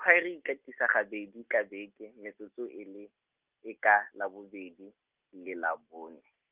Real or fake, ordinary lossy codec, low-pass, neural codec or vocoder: real; Opus, 24 kbps; 3.6 kHz; none